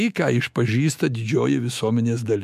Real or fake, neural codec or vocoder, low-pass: fake; autoencoder, 48 kHz, 128 numbers a frame, DAC-VAE, trained on Japanese speech; 14.4 kHz